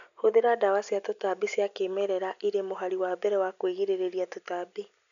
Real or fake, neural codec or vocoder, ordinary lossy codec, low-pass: real; none; none; 7.2 kHz